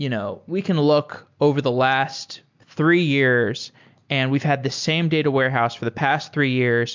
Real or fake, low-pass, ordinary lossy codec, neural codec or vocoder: real; 7.2 kHz; MP3, 64 kbps; none